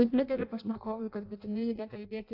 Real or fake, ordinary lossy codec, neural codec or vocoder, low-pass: fake; AAC, 48 kbps; codec, 16 kHz in and 24 kHz out, 0.6 kbps, FireRedTTS-2 codec; 5.4 kHz